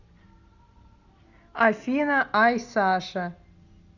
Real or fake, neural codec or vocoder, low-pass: real; none; 7.2 kHz